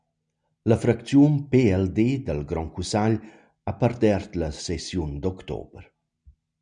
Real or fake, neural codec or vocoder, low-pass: real; none; 9.9 kHz